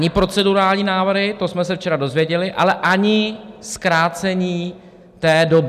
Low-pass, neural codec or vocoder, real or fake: 14.4 kHz; none; real